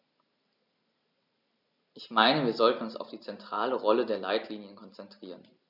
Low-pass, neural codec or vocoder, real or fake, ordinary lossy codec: 5.4 kHz; none; real; none